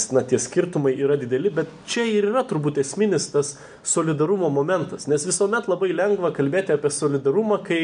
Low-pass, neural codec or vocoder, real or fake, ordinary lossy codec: 9.9 kHz; none; real; AAC, 96 kbps